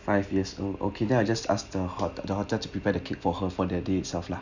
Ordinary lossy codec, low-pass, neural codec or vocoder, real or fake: none; 7.2 kHz; none; real